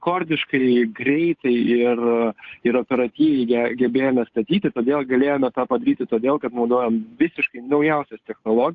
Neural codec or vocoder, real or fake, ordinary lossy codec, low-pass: codec, 16 kHz, 8 kbps, FunCodec, trained on Chinese and English, 25 frames a second; fake; AAC, 48 kbps; 7.2 kHz